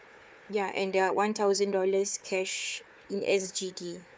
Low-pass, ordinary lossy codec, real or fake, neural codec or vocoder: none; none; fake; codec, 16 kHz, 4 kbps, FunCodec, trained on Chinese and English, 50 frames a second